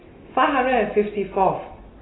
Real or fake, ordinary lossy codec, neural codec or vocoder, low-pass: real; AAC, 16 kbps; none; 7.2 kHz